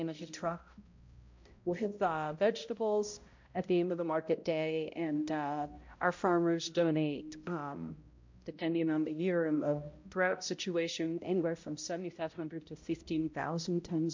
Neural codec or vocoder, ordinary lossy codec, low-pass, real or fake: codec, 16 kHz, 0.5 kbps, X-Codec, HuBERT features, trained on balanced general audio; MP3, 48 kbps; 7.2 kHz; fake